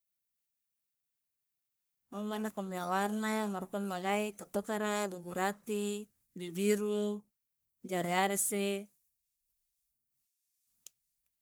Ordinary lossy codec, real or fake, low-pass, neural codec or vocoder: none; fake; none; codec, 44.1 kHz, 1.7 kbps, Pupu-Codec